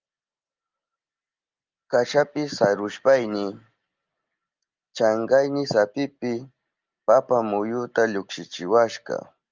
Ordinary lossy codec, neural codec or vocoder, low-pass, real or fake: Opus, 24 kbps; none; 7.2 kHz; real